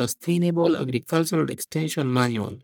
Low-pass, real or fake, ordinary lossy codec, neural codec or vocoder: none; fake; none; codec, 44.1 kHz, 1.7 kbps, Pupu-Codec